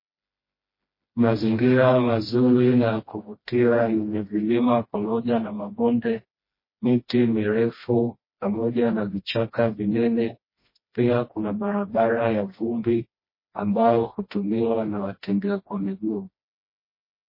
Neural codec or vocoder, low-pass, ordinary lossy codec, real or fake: codec, 16 kHz, 1 kbps, FreqCodec, smaller model; 5.4 kHz; MP3, 24 kbps; fake